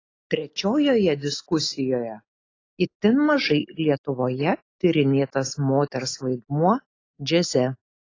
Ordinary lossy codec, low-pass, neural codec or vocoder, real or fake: AAC, 32 kbps; 7.2 kHz; none; real